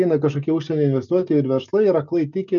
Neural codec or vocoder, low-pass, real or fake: none; 7.2 kHz; real